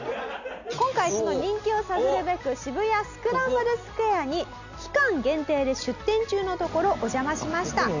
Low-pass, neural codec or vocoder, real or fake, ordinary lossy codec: 7.2 kHz; none; real; none